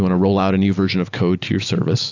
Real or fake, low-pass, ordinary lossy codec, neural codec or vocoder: real; 7.2 kHz; AAC, 48 kbps; none